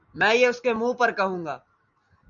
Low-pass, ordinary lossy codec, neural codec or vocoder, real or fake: 7.2 kHz; MP3, 96 kbps; none; real